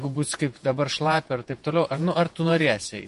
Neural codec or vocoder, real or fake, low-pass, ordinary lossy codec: vocoder, 48 kHz, 128 mel bands, Vocos; fake; 14.4 kHz; MP3, 48 kbps